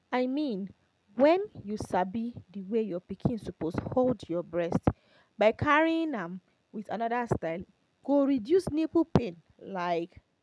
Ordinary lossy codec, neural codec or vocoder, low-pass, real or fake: none; none; none; real